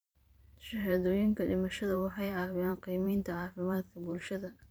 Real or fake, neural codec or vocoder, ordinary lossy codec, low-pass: fake; vocoder, 44.1 kHz, 128 mel bands every 512 samples, BigVGAN v2; none; none